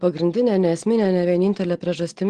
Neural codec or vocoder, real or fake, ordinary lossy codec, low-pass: none; real; Opus, 16 kbps; 9.9 kHz